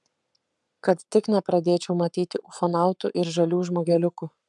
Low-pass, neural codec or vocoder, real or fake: 10.8 kHz; codec, 44.1 kHz, 7.8 kbps, Pupu-Codec; fake